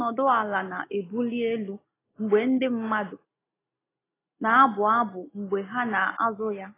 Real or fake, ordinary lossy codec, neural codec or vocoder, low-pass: real; AAC, 16 kbps; none; 3.6 kHz